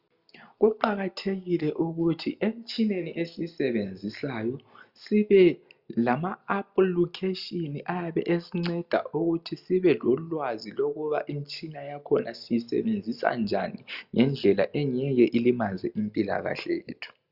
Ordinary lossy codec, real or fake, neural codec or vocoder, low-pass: Opus, 64 kbps; real; none; 5.4 kHz